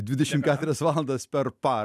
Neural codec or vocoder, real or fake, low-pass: none; real; 14.4 kHz